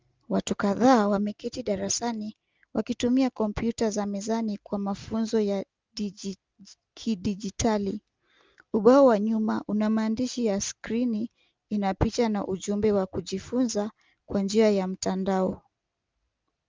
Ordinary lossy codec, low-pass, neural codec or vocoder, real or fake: Opus, 24 kbps; 7.2 kHz; none; real